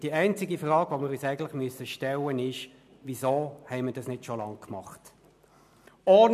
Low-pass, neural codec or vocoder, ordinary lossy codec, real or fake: 14.4 kHz; none; MP3, 96 kbps; real